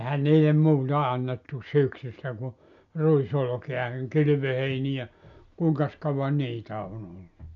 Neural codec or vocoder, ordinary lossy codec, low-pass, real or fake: none; none; 7.2 kHz; real